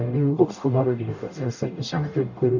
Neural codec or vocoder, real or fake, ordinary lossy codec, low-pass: codec, 44.1 kHz, 0.9 kbps, DAC; fake; none; 7.2 kHz